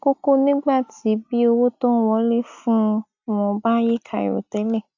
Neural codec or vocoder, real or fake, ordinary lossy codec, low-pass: none; real; AAC, 48 kbps; 7.2 kHz